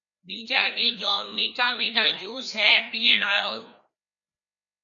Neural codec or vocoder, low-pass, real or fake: codec, 16 kHz, 1 kbps, FreqCodec, larger model; 7.2 kHz; fake